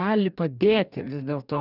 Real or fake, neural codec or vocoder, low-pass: fake; codec, 44.1 kHz, 2.6 kbps, DAC; 5.4 kHz